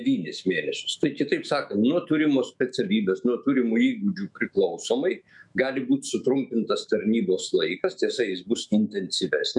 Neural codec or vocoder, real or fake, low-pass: autoencoder, 48 kHz, 128 numbers a frame, DAC-VAE, trained on Japanese speech; fake; 10.8 kHz